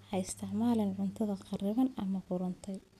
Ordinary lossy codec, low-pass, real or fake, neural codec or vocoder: none; 14.4 kHz; real; none